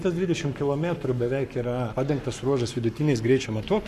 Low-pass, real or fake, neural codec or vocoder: 14.4 kHz; fake; codec, 44.1 kHz, 7.8 kbps, Pupu-Codec